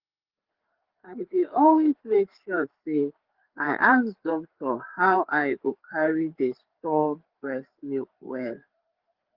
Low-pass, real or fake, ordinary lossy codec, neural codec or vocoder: 5.4 kHz; fake; Opus, 16 kbps; codec, 16 kHz, 4 kbps, FreqCodec, larger model